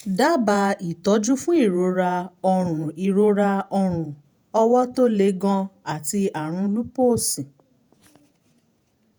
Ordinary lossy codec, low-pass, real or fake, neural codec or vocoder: none; none; fake; vocoder, 48 kHz, 128 mel bands, Vocos